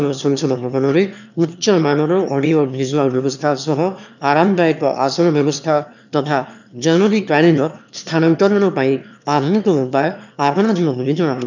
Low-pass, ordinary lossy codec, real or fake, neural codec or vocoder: 7.2 kHz; none; fake; autoencoder, 22.05 kHz, a latent of 192 numbers a frame, VITS, trained on one speaker